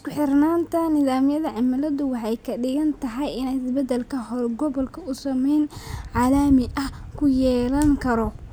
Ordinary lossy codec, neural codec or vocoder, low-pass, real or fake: none; none; none; real